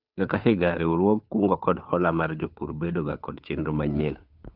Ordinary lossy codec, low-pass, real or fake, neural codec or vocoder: none; 5.4 kHz; fake; codec, 16 kHz, 2 kbps, FunCodec, trained on Chinese and English, 25 frames a second